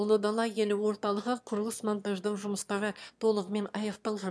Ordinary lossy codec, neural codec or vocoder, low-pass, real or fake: none; autoencoder, 22.05 kHz, a latent of 192 numbers a frame, VITS, trained on one speaker; none; fake